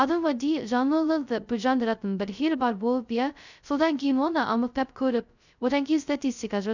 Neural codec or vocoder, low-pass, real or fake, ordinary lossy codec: codec, 16 kHz, 0.2 kbps, FocalCodec; 7.2 kHz; fake; none